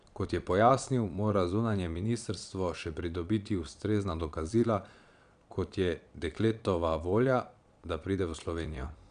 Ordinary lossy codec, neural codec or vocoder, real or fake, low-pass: none; vocoder, 22.05 kHz, 80 mel bands, Vocos; fake; 9.9 kHz